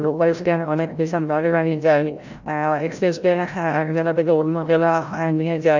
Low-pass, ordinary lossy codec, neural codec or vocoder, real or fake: 7.2 kHz; none; codec, 16 kHz, 0.5 kbps, FreqCodec, larger model; fake